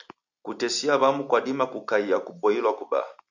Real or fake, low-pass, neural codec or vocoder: real; 7.2 kHz; none